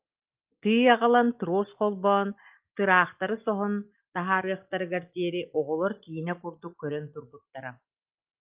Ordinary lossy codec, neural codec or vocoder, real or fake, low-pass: Opus, 24 kbps; none; real; 3.6 kHz